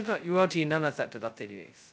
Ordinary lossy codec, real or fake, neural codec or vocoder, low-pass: none; fake; codec, 16 kHz, 0.2 kbps, FocalCodec; none